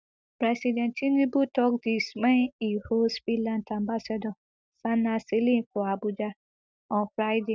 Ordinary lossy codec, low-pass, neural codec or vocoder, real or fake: none; none; none; real